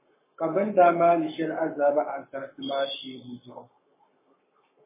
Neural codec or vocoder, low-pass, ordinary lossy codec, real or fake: codec, 44.1 kHz, 7.8 kbps, Pupu-Codec; 3.6 kHz; MP3, 16 kbps; fake